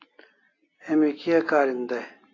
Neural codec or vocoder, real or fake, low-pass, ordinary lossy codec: none; real; 7.2 kHz; AAC, 32 kbps